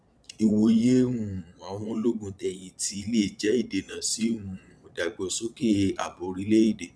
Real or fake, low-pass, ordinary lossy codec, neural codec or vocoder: fake; none; none; vocoder, 22.05 kHz, 80 mel bands, Vocos